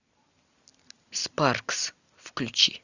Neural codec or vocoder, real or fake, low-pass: none; real; 7.2 kHz